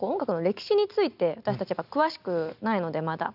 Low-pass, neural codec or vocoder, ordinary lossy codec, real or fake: 5.4 kHz; none; none; real